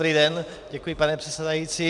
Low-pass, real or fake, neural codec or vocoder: 10.8 kHz; fake; vocoder, 44.1 kHz, 128 mel bands every 256 samples, BigVGAN v2